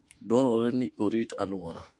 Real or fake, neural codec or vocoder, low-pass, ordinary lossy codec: fake; autoencoder, 48 kHz, 32 numbers a frame, DAC-VAE, trained on Japanese speech; 10.8 kHz; MP3, 48 kbps